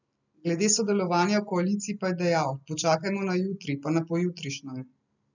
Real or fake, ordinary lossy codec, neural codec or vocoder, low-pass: real; none; none; 7.2 kHz